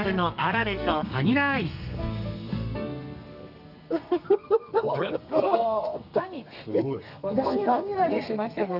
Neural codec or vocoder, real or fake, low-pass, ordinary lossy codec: codec, 32 kHz, 1.9 kbps, SNAC; fake; 5.4 kHz; none